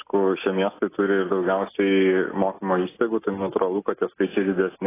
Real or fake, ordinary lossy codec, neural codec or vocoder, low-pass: real; AAC, 16 kbps; none; 3.6 kHz